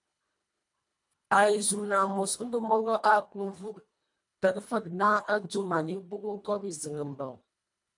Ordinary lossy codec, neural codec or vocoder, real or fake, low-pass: MP3, 64 kbps; codec, 24 kHz, 1.5 kbps, HILCodec; fake; 10.8 kHz